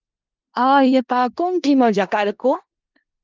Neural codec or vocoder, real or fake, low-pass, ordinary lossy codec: codec, 16 kHz in and 24 kHz out, 0.4 kbps, LongCat-Audio-Codec, four codebook decoder; fake; 7.2 kHz; Opus, 24 kbps